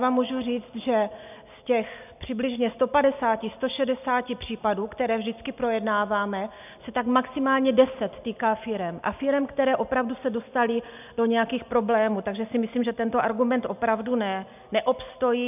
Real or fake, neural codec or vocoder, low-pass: real; none; 3.6 kHz